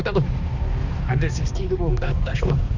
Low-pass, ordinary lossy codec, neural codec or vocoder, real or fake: 7.2 kHz; none; codec, 16 kHz, 2 kbps, X-Codec, HuBERT features, trained on balanced general audio; fake